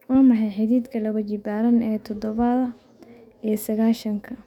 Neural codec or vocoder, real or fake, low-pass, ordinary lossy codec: autoencoder, 48 kHz, 128 numbers a frame, DAC-VAE, trained on Japanese speech; fake; 19.8 kHz; none